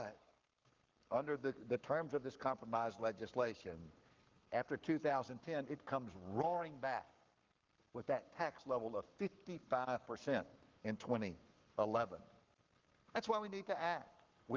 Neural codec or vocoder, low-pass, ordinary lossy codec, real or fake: codec, 44.1 kHz, 7.8 kbps, Pupu-Codec; 7.2 kHz; Opus, 32 kbps; fake